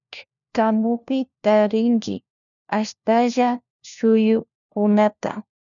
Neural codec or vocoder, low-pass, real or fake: codec, 16 kHz, 1 kbps, FunCodec, trained on LibriTTS, 50 frames a second; 7.2 kHz; fake